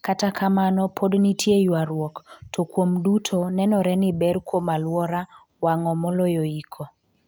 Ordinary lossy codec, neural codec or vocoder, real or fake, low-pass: none; none; real; none